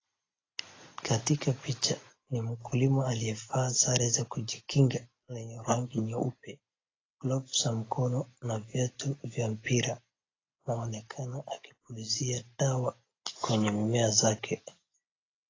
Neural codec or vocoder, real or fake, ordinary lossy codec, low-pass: none; real; AAC, 32 kbps; 7.2 kHz